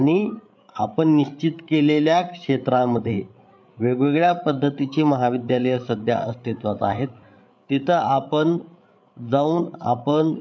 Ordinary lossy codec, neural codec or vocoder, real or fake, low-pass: none; codec, 16 kHz, 8 kbps, FreqCodec, larger model; fake; none